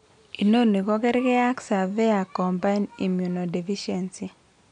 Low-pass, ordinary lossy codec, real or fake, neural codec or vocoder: 9.9 kHz; none; real; none